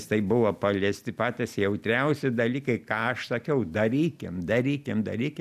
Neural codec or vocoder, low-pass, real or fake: none; 14.4 kHz; real